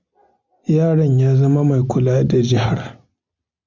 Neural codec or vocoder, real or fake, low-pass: none; real; 7.2 kHz